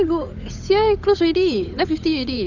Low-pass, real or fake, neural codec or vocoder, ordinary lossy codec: 7.2 kHz; fake; codec, 16 kHz, 8 kbps, FunCodec, trained on Chinese and English, 25 frames a second; none